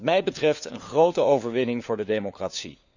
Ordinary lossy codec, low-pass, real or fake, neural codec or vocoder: none; 7.2 kHz; fake; codec, 16 kHz, 4 kbps, FunCodec, trained on LibriTTS, 50 frames a second